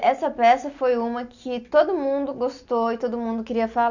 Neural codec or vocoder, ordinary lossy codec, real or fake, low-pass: none; none; real; 7.2 kHz